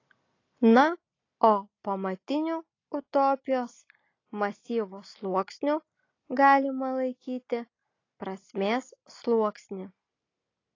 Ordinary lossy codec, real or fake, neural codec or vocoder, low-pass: AAC, 32 kbps; real; none; 7.2 kHz